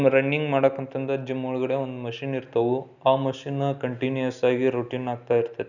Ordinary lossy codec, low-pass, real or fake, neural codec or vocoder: none; 7.2 kHz; real; none